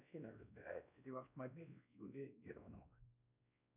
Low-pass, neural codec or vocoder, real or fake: 3.6 kHz; codec, 16 kHz, 1 kbps, X-Codec, WavLM features, trained on Multilingual LibriSpeech; fake